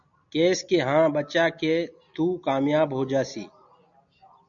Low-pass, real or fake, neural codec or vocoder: 7.2 kHz; real; none